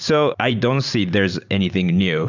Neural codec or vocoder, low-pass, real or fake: vocoder, 44.1 kHz, 128 mel bands every 512 samples, BigVGAN v2; 7.2 kHz; fake